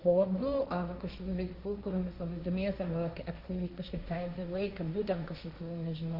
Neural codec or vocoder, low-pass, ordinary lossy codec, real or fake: codec, 16 kHz, 1.1 kbps, Voila-Tokenizer; 5.4 kHz; Opus, 64 kbps; fake